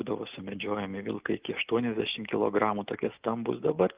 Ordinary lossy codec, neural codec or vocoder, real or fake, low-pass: Opus, 32 kbps; none; real; 3.6 kHz